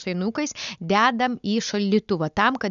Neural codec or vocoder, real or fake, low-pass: none; real; 7.2 kHz